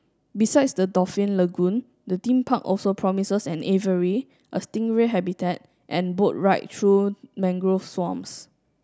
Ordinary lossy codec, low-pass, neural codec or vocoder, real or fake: none; none; none; real